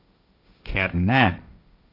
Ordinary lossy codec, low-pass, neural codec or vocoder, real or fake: none; 5.4 kHz; codec, 16 kHz, 1.1 kbps, Voila-Tokenizer; fake